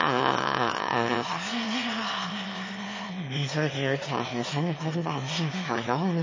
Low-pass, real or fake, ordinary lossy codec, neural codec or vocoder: 7.2 kHz; fake; MP3, 32 kbps; autoencoder, 22.05 kHz, a latent of 192 numbers a frame, VITS, trained on one speaker